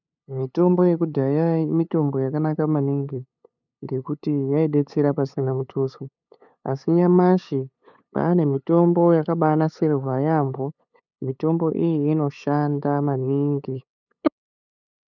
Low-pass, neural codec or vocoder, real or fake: 7.2 kHz; codec, 16 kHz, 8 kbps, FunCodec, trained on LibriTTS, 25 frames a second; fake